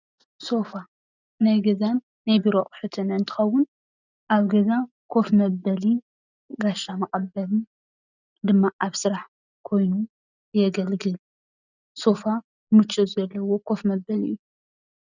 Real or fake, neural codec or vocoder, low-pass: real; none; 7.2 kHz